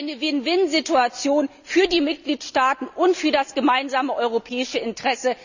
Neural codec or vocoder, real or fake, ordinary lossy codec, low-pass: none; real; none; 7.2 kHz